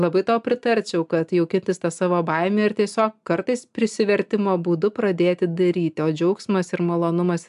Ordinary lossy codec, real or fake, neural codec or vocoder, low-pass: AAC, 96 kbps; real; none; 10.8 kHz